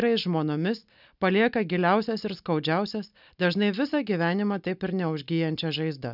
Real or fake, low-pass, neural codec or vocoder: real; 5.4 kHz; none